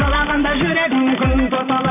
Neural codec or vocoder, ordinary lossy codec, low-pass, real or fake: none; none; 3.6 kHz; real